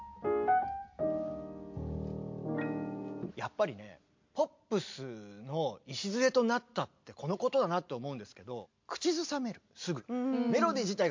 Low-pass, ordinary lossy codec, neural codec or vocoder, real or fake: 7.2 kHz; MP3, 48 kbps; none; real